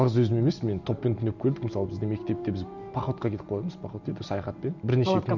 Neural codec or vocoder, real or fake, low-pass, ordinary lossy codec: none; real; 7.2 kHz; none